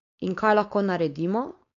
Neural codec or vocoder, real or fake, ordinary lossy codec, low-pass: codec, 16 kHz, 4.8 kbps, FACodec; fake; AAC, 48 kbps; 7.2 kHz